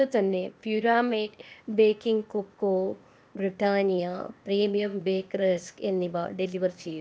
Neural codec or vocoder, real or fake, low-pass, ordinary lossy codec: codec, 16 kHz, 0.8 kbps, ZipCodec; fake; none; none